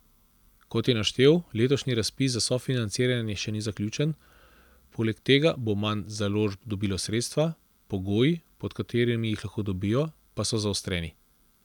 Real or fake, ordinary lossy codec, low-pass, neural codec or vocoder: real; none; 19.8 kHz; none